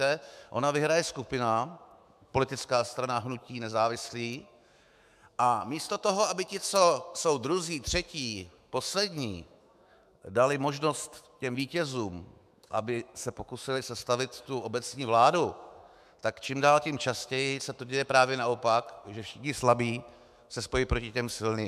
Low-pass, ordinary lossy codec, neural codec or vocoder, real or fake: 14.4 kHz; MP3, 96 kbps; autoencoder, 48 kHz, 128 numbers a frame, DAC-VAE, trained on Japanese speech; fake